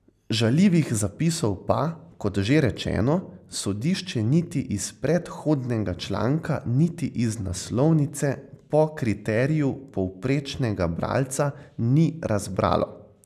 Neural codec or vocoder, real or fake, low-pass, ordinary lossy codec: none; real; 14.4 kHz; none